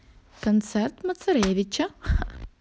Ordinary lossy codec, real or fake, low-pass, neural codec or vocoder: none; real; none; none